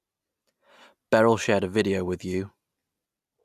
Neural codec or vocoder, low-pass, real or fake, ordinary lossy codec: none; 14.4 kHz; real; none